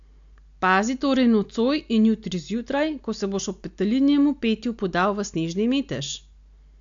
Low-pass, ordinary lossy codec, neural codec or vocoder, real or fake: 7.2 kHz; AAC, 64 kbps; none; real